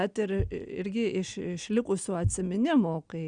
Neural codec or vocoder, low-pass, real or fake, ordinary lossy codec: none; 9.9 kHz; real; Opus, 64 kbps